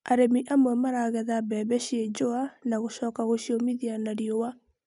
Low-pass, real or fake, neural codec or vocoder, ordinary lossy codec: 10.8 kHz; real; none; none